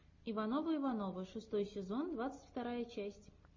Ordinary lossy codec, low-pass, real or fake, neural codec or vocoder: MP3, 32 kbps; 7.2 kHz; real; none